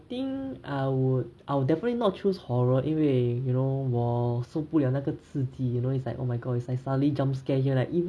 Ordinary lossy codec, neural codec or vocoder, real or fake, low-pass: none; none; real; none